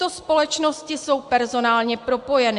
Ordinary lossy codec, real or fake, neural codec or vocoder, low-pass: AAC, 64 kbps; real; none; 10.8 kHz